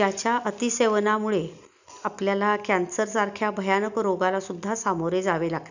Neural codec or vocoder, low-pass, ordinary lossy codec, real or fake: none; 7.2 kHz; none; real